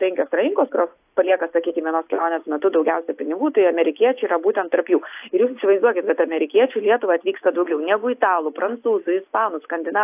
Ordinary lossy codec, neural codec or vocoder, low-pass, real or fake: AAC, 32 kbps; none; 3.6 kHz; real